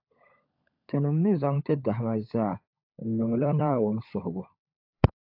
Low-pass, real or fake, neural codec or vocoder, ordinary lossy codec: 5.4 kHz; fake; codec, 16 kHz, 16 kbps, FunCodec, trained on LibriTTS, 50 frames a second; AAC, 48 kbps